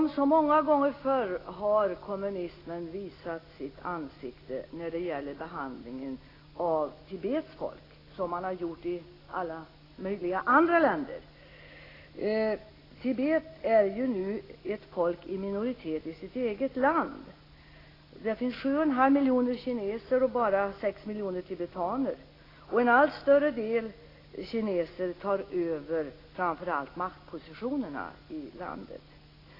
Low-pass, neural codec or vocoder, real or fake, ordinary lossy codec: 5.4 kHz; none; real; AAC, 24 kbps